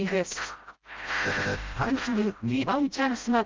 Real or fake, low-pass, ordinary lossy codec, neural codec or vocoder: fake; 7.2 kHz; Opus, 24 kbps; codec, 16 kHz, 0.5 kbps, FreqCodec, smaller model